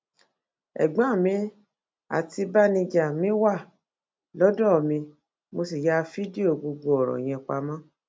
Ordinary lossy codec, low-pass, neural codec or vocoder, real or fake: none; none; none; real